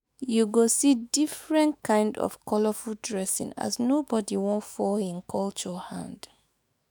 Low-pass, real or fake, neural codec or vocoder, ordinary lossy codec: none; fake; autoencoder, 48 kHz, 128 numbers a frame, DAC-VAE, trained on Japanese speech; none